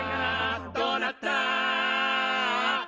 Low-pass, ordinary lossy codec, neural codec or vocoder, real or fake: 7.2 kHz; Opus, 24 kbps; none; real